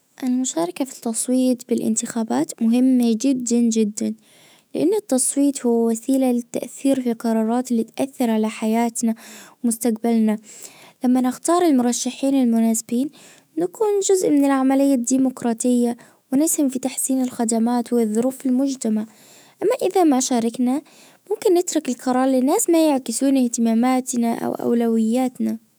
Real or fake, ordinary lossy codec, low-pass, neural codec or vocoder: fake; none; none; autoencoder, 48 kHz, 128 numbers a frame, DAC-VAE, trained on Japanese speech